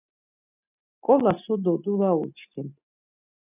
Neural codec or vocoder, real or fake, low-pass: none; real; 3.6 kHz